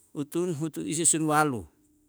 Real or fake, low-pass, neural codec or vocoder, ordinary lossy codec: fake; none; autoencoder, 48 kHz, 32 numbers a frame, DAC-VAE, trained on Japanese speech; none